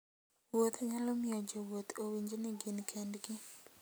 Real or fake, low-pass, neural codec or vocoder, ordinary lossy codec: real; none; none; none